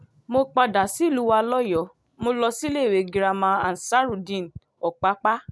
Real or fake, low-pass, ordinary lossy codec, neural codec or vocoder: real; none; none; none